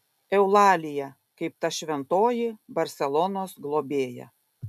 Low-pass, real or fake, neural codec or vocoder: 14.4 kHz; real; none